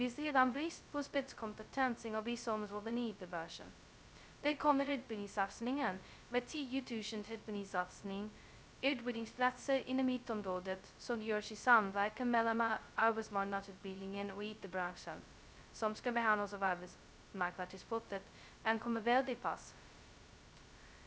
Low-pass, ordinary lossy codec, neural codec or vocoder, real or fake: none; none; codec, 16 kHz, 0.2 kbps, FocalCodec; fake